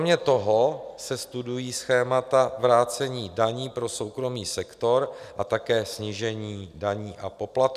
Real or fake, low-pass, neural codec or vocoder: real; 14.4 kHz; none